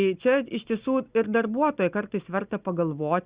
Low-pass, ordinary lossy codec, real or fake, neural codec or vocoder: 3.6 kHz; Opus, 64 kbps; real; none